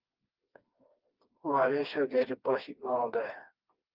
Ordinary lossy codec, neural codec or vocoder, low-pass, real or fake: Opus, 32 kbps; codec, 16 kHz, 2 kbps, FreqCodec, smaller model; 5.4 kHz; fake